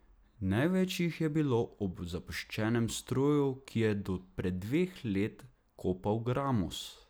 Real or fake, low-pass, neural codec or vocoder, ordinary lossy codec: real; none; none; none